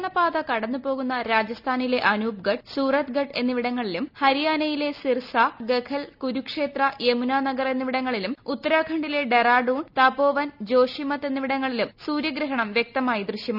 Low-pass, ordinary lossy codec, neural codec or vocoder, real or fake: 5.4 kHz; none; none; real